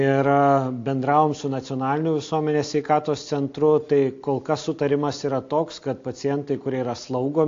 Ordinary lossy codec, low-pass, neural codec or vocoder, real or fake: AAC, 48 kbps; 7.2 kHz; none; real